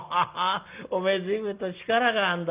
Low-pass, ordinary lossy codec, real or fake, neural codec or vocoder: 3.6 kHz; Opus, 32 kbps; real; none